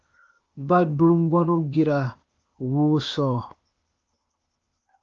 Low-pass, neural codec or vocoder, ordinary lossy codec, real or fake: 7.2 kHz; codec, 16 kHz, 0.8 kbps, ZipCodec; Opus, 24 kbps; fake